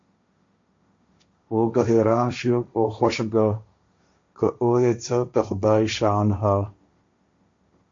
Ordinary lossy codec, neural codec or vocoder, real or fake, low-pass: MP3, 48 kbps; codec, 16 kHz, 1.1 kbps, Voila-Tokenizer; fake; 7.2 kHz